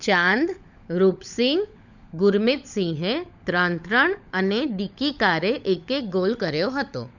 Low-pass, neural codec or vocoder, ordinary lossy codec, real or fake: 7.2 kHz; codec, 16 kHz, 16 kbps, FunCodec, trained on LibriTTS, 50 frames a second; none; fake